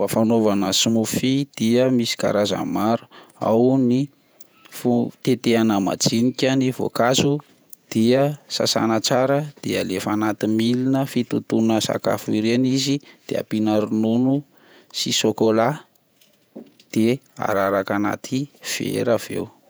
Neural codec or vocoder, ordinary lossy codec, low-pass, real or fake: vocoder, 48 kHz, 128 mel bands, Vocos; none; none; fake